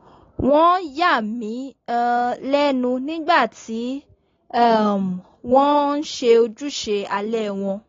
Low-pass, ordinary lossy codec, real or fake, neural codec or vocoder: 7.2 kHz; AAC, 32 kbps; real; none